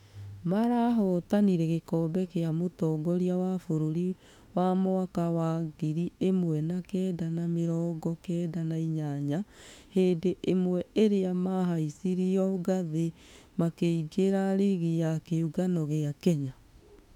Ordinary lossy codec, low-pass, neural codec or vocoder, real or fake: MP3, 96 kbps; 19.8 kHz; autoencoder, 48 kHz, 32 numbers a frame, DAC-VAE, trained on Japanese speech; fake